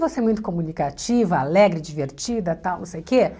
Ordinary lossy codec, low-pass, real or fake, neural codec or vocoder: none; none; real; none